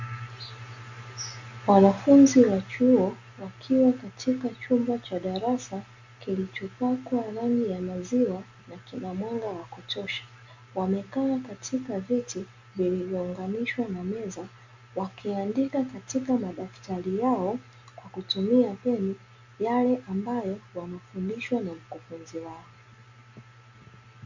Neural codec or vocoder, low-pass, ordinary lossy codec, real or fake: none; 7.2 kHz; MP3, 64 kbps; real